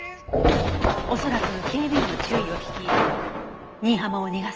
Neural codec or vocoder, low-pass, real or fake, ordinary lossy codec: none; 7.2 kHz; real; Opus, 24 kbps